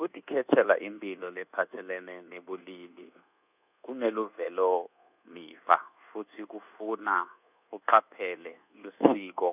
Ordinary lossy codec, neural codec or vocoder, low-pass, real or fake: none; codec, 24 kHz, 1.2 kbps, DualCodec; 3.6 kHz; fake